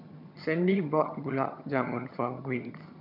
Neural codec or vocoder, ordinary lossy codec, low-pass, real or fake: vocoder, 22.05 kHz, 80 mel bands, HiFi-GAN; none; 5.4 kHz; fake